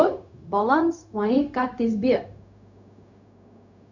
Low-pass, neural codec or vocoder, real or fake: 7.2 kHz; codec, 16 kHz, 0.4 kbps, LongCat-Audio-Codec; fake